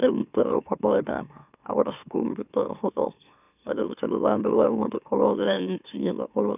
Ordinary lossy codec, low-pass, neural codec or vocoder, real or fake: none; 3.6 kHz; autoencoder, 44.1 kHz, a latent of 192 numbers a frame, MeloTTS; fake